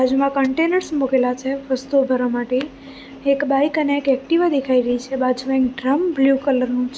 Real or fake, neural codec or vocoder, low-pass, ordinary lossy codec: real; none; none; none